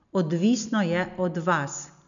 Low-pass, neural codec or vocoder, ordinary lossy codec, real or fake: 7.2 kHz; none; none; real